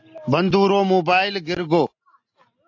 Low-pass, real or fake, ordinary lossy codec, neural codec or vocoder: 7.2 kHz; real; AAC, 48 kbps; none